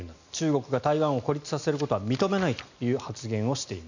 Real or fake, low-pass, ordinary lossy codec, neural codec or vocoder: real; 7.2 kHz; none; none